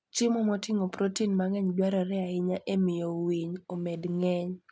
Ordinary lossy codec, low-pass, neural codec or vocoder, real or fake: none; none; none; real